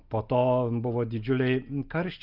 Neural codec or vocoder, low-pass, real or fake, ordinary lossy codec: none; 5.4 kHz; real; Opus, 24 kbps